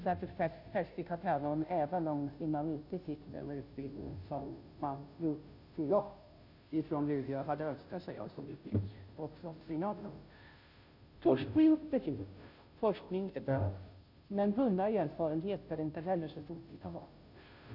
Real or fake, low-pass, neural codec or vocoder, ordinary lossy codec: fake; 5.4 kHz; codec, 16 kHz, 0.5 kbps, FunCodec, trained on Chinese and English, 25 frames a second; none